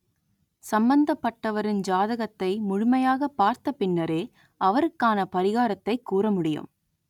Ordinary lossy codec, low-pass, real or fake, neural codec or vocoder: none; 19.8 kHz; real; none